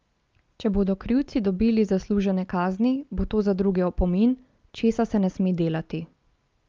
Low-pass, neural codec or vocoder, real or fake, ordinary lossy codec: 7.2 kHz; none; real; Opus, 24 kbps